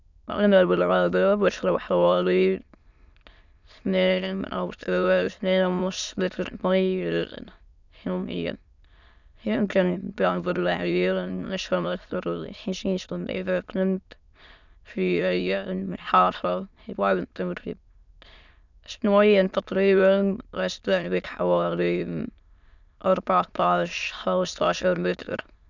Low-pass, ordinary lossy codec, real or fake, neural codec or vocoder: 7.2 kHz; none; fake; autoencoder, 22.05 kHz, a latent of 192 numbers a frame, VITS, trained on many speakers